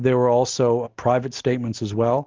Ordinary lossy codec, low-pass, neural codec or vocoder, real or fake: Opus, 24 kbps; 7.2 kHz; none; real